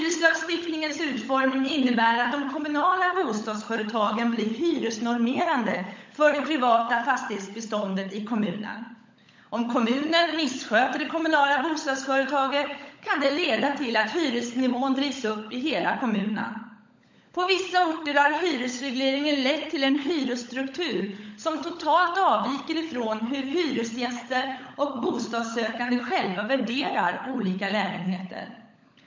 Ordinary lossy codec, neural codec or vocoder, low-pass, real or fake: MP3, 48 kbps; codec, 16 kHz, 16 kbps, FunCodec, trained on LibriTTS, 50 frames a second; 7.2 kHz; fake